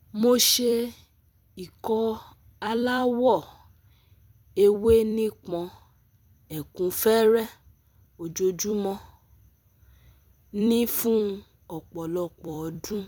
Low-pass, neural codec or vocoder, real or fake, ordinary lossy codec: 19.8 kHz; vocoder, 44.1 kHz, 128 mel bands every 256 samples, BigVGAN v2; fake; none